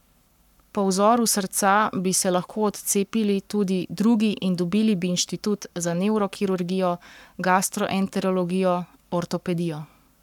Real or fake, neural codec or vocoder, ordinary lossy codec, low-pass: fake; codec, 44.1 kHz, 7.8 kbps, Pupu-Codec; none; 19.8 kHz